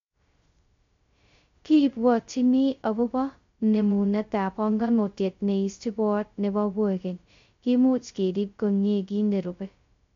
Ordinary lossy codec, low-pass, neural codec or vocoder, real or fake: MP3, 48 kbps; 7.2 kHz; codec, 16 kHz, 0.2 kbps, FocalCodec; fake